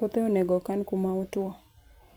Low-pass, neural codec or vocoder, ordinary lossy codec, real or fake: none; vocoder, 44.1 kHz, 128 mel bands every 256 samples, BigVGAN v2; none; fake